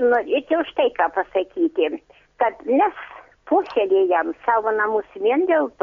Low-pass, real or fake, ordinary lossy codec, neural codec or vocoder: 7.2 kHz; real; MP3, 48 kbps; none